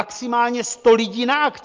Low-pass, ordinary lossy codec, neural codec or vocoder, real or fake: 7.2 kHz; Opus, 24 kbps; none; real